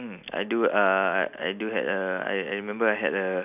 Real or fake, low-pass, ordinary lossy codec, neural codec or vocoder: real; 3.6 kHz; none; none